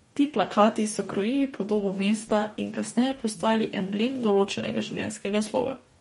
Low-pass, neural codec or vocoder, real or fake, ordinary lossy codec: 19.8 kHz; codec, 44.1 kHz, 2.6 kbps, DAC; fake; MP3, 48 kbps